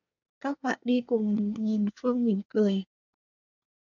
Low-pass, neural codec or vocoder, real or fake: 7.2 kHz; codec, 24 kHz, 1 kbps, SNAC; fake